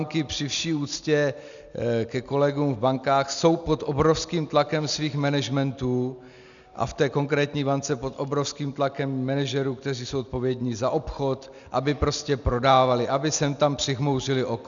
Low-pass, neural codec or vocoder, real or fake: 7.2 kHz; none; real